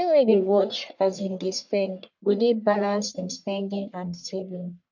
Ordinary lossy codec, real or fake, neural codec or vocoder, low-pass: none; fake; codec, 44.1 kHz, 1.7 kbps, Pupu-Codec; 7.2 kHz